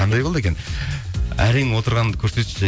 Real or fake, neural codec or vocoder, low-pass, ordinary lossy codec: real; none; none; none